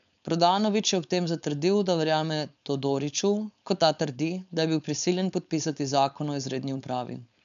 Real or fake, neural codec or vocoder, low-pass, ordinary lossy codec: fake; codec, 16 kHz, 4.8 kbps, FACodec; 7.2 kHz; AAC, 96 kbps